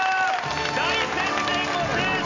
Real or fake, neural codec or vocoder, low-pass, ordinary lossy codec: real; none; 7.2 kHz; AAC, 48 kbps